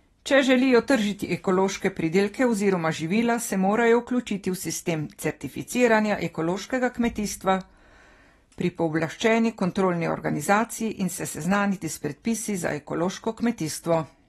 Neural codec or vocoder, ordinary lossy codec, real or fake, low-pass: none; AAC, 32 kbps; real; 19.8 kHz